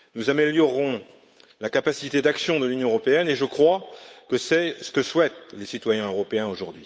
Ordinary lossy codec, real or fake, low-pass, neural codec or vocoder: none; fake; none; codec, 16 kHz, 8 kbps, FunCodec, trained on Chinese and English, 25 frames a second